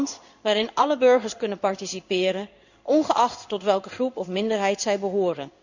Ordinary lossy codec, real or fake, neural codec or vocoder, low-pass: none; fake; codec, 16 kHz in and 24 kHz out, 1 kbps, XY-Tokenizer; 7.2 kHz